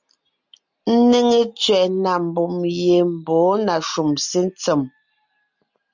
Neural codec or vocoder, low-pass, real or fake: none; 7.2 kHz; real